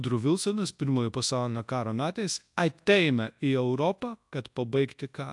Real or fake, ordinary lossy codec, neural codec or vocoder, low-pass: fake; AAC, 64 kbps; codec, 24 kHz, 0.9 kbps, WavTokenizer, large speech release; 10.8 kHz